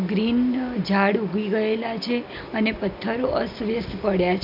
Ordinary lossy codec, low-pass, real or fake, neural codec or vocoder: none; 5.4 kHz; real; none